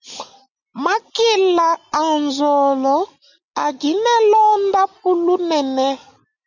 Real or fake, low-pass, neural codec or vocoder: real; 7.2 kHz; none